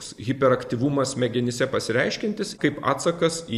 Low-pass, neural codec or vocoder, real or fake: 14.4 kHz; none; real